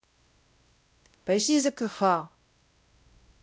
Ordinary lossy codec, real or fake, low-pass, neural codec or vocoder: none; fake; none; codec, 16 kHz, 0.5 kbps, X-Codec, WavLM features, trained on Multilingual LibriSpeech